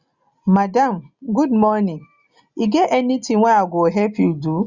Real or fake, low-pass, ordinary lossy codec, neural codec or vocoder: real; 7.2 kHz; Opus, 64 kbps; none